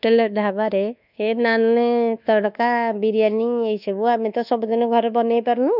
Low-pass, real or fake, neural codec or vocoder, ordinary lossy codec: 5.4 kHz; fake; codec, 24 kHz, 1.2 kbps, DualCodec; none